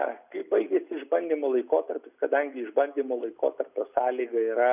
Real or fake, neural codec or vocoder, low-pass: real; none; 3.6 kHz